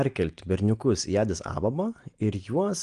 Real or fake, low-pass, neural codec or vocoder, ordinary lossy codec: real; 9.9 kHz; none; Opus, 24 kbps